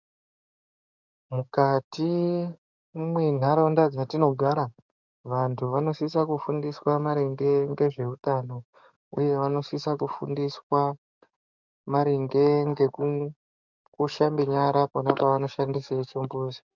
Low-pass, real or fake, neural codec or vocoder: 7.2 kHz; fake; codec, 44.1 kHz, 7.8 kbps, DAC